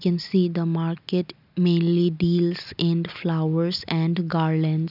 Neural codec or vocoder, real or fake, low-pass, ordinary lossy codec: none; real; 5.4 kHz; none